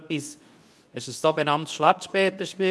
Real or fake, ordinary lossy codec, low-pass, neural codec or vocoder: fake; none; none; codec, 24 kHz, 0.9 kbps, WavTokenizer, medium speech release version 2